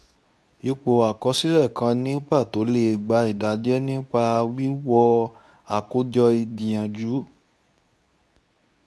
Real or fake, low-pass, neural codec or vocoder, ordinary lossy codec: fake; none; codec, 24 kHz, 0.9 kbps, WavTokenizer, medium speech release version 2; none